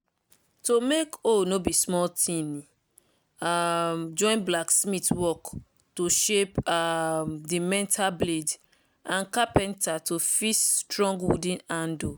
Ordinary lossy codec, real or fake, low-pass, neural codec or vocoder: none; real; none; none